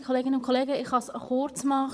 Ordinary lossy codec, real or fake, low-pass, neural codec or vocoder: none; fake; none; vocoder, 22.05 kHz, 80 mel bands, Vocos